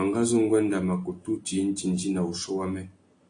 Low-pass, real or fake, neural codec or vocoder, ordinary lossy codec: 9.9 kHz; real; none; AAC, 48 kbps